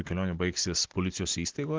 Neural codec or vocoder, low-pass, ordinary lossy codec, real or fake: none; 7.2 kHz; Opus, 16 kbps; real